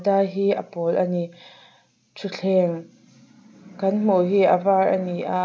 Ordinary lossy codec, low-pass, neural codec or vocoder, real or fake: none; none; none; real